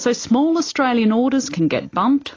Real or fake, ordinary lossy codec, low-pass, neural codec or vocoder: real; AAC, 32 kbps; 7.2 kHz; none